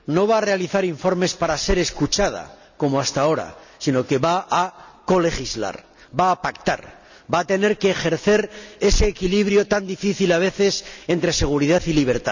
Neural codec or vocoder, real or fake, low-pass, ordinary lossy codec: none; real; 7.2 kHz; none